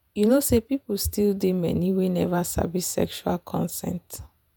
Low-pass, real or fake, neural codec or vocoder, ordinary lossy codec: none; fake; vocoder, 48 kHz, 128 mel bands, Vocos; none